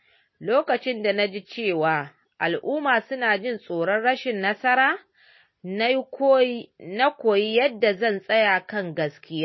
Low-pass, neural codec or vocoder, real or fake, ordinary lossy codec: 7.2 kHz; none; real; MP3, 24 kbps